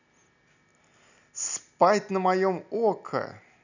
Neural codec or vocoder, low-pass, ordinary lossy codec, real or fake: none; 7.2 kHz; none; real